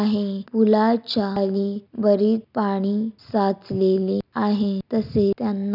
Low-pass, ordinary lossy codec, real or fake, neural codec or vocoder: 5.4 kHz; none; real; none